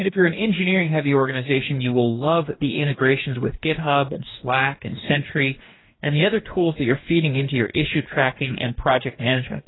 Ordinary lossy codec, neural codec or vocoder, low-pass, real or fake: AAC, 16 kbps; codec, 44.1 kHz, 2.6 kbps, DAC; 7.2 kHz; fake